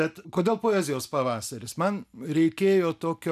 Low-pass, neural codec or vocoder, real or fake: 14.4 kHz; none; real